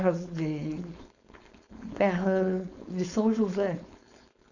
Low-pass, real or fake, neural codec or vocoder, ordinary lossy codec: 7.2 kHz; fake; codec, 16 kHz, 4.8 kbps, FACodec; none